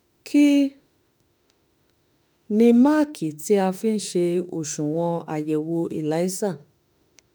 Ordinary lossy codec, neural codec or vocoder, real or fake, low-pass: none; autoencoder, 48 kHz, 32 numbers a frame, DAC-VAE, trained on Japanese speech; fake; none